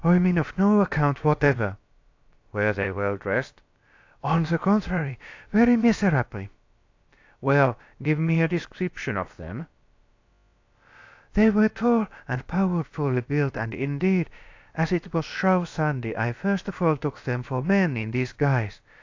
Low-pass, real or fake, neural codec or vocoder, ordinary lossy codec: 7.2 kHz; fake; codec, 16 kHz, about 1 kbps, DyCAST, with the encoder's durations; AAC, 48 kbps